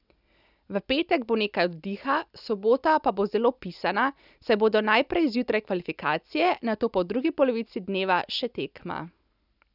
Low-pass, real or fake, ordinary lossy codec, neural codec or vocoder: 5.4 kHz; real; none; none